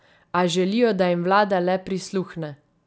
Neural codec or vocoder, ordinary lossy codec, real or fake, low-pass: none; none; real; none